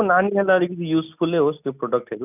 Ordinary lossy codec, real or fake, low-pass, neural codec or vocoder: none; real; 3.6 kHz; none